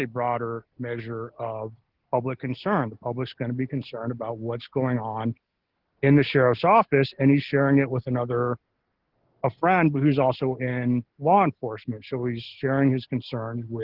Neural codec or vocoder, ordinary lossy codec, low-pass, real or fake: none; Opus, 16 kbps; 5.4 kHz; real